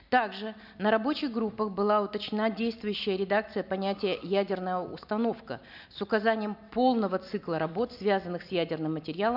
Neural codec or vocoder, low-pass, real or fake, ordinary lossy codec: none; 5.4 kHz; real; none